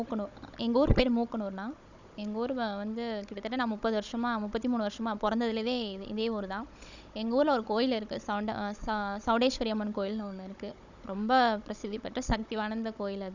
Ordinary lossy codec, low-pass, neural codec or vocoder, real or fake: none; 7.2 kHz; codec, 16 kHz, 16 kbps, FunCodec, trained on Chinese and English, 50 frames a second; fake